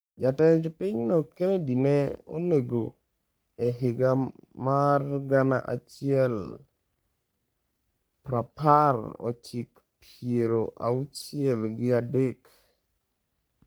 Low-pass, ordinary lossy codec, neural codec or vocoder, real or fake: none; none; codec, 44.1 kHz, 3.4 kbps, Pupu-Codec; fake